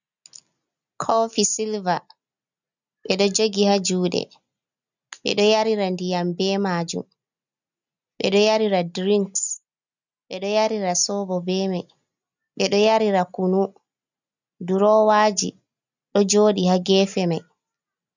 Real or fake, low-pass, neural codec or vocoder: real; 7.2 kHz; none